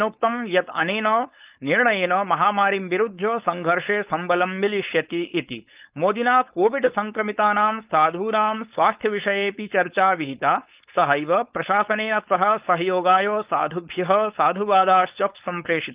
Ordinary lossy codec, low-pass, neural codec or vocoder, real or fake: Opus, 32 kbps; 3.6 kHz; codec, 16 kHz, 4.8 kbps, FACodec; fake